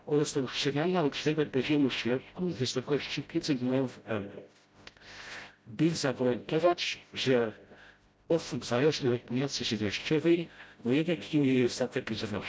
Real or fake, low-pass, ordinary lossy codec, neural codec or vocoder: fake; none; none; codec, 16 kHz, 0.5 kbps, FreqCodec, smaller model